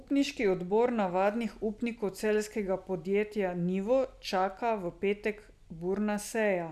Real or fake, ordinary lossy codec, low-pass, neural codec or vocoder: real; none; 14.4 kHz; none